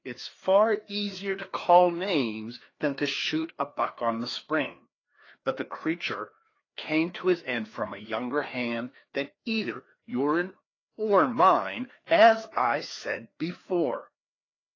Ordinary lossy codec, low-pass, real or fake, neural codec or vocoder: AAC, 32 kbps; 7.2 kHz; fake; codec, 16 kHz, 2 kbps, FreqCodec, larger model